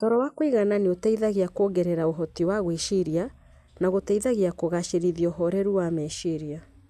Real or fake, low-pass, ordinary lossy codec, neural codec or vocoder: real; 14.4 kHz; none; none